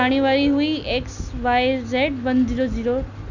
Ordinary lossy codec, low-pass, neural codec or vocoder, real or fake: none; 7.2 kHz; none; real